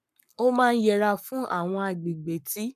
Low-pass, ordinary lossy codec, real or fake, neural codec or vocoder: 14.4 kHz; none; fake; codec, 44.1 kHz, 7.8 kbps, Pupu-Codec